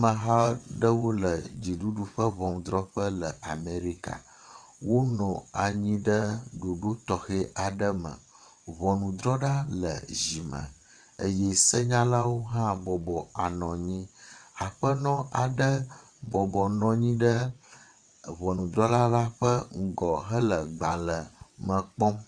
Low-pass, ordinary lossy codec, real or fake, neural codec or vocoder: 9.9 kHz; Opus, 64 kbps; fake; vocoder, 22.05 kHz, 80 mel bands, WaveNeXt